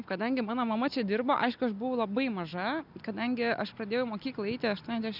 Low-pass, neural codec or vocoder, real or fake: 5.4 kHz; none; real